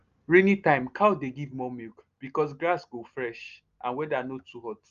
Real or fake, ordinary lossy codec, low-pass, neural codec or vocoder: real; Opus, 32 kbps; 7.2 kHz; none